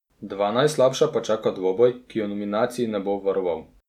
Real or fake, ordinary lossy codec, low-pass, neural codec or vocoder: real; none; 19.8 kHz; none